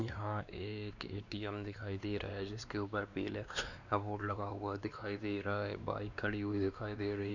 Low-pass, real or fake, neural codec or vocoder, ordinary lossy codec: 7.2 kHz; fake; codec, 16 kHz, 2 kbps, X-Codec, WavLM features, trained on Multilingual LibriSpeech; none